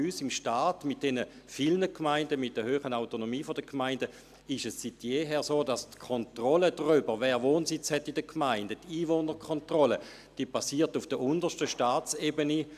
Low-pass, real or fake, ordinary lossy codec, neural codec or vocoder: 14.4 kHz; real; AAC, 96 kbps; none